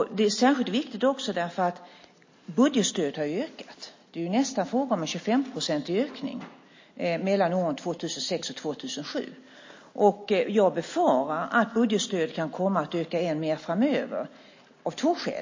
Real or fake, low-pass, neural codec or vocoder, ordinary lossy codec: real; 7.2 kHz; none; MP3, 32 kbps